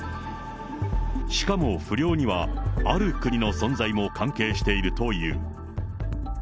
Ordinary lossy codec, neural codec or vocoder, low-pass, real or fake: none; none; none; real